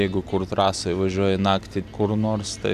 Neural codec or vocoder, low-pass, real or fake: none; 14.4 kHz; real